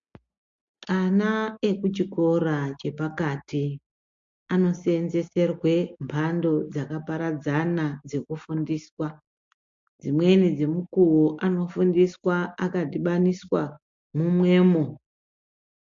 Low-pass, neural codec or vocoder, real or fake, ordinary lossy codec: 7.2 kHz; none; real; MP3, 48 kbps